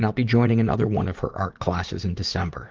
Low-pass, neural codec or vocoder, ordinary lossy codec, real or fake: 7.2 kHz; vocoder, 44.1 kHz, 80 mel bands, Vocos; Opus, 16 kbps; fake